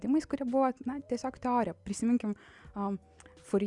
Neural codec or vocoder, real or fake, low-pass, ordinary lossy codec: none; real; 10.8 kHz; Opus, 64 kbps